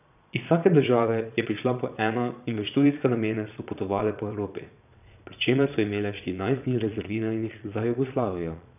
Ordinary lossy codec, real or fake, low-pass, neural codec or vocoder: none; fake; 3.6 kHz; vocoder, 22.05 kHz, 80 mel bands, Vocos